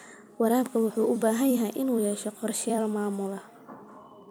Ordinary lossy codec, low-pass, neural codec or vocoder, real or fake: none; none; vocoder, 44.1 kHz, 128 mel bands every 256 samples, BigVGAN v2; fake